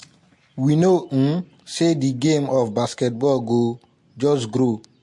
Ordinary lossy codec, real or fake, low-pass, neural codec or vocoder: MP3, 48 kbps; real; 10.8 kHz; none